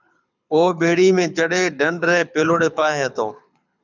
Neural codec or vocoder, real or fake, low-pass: codec, 24 kHz, 6 kbps, HILCodec; fake; 7.2 kHz